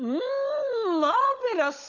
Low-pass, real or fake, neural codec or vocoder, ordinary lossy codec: 7.2 kHz; fake; codec, 16 kHz, 16 kbps, FunCodec, trained on LibriTTS, 50 frames a second; none